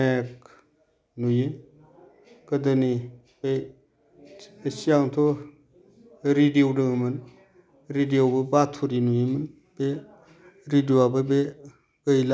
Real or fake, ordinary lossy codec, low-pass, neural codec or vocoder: real; none; none; none